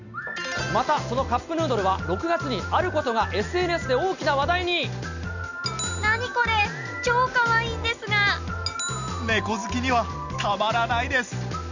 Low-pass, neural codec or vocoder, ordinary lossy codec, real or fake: 7.2 kHz; none; none; real